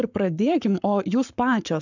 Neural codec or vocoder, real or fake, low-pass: codec, 44.1 kHz, 7.8 kbps, Pupu-Codec; fake; 7.2 kHz